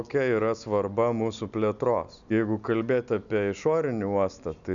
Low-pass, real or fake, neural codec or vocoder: 7.2 kHz; real; none